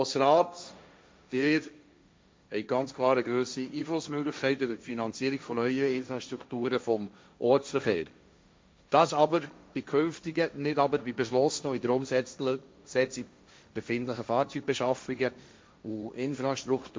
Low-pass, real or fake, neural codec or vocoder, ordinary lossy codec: none; fake; codec, 16 kHz, 1.1 kbps, Voila-Tokenizer; none